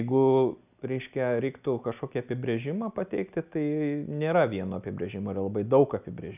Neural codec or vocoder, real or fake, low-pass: none; real; 3.6 kHz